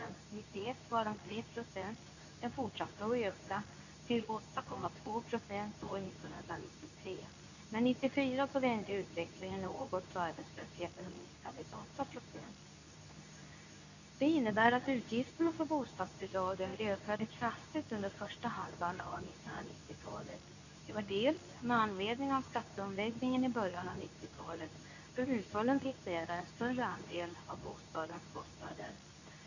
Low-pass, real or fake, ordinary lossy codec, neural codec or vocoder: 7.2 kHz; fake; none; codec, 24 kHz, 0.9 kbps, WavTokenizer, medium speech release version 2